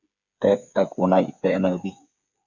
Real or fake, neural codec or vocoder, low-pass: fake; codec, 16 kHz, 8 kbps, FreqCodec, smaller model; 7.2 kHz